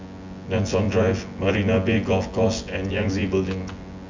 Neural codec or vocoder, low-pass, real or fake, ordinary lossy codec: vocoder, 24 kHz, 100 mel bands, Vocos; 7.2 kHz; fake; MP3, 64 kbps